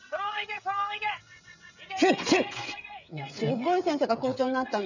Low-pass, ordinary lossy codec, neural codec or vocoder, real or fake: 7.2 kHz; none; codec, 16 kHz, 16 kbps, FreqCodec, smaller model; fake